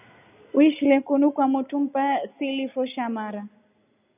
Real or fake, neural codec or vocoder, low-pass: real; none; 3.6 kHz